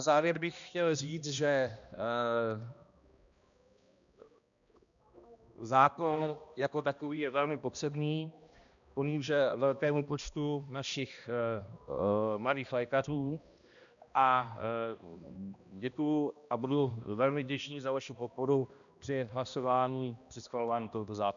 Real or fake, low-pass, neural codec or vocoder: fake; 7.2 kHz; codec, 16 kHz, 1 kbps, X-Codec, HuBERT features, trained on balanced general audio